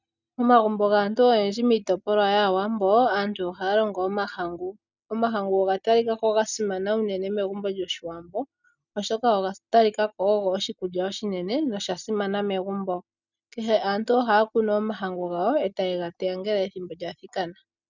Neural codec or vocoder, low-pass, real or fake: none; 7.2 kHz; real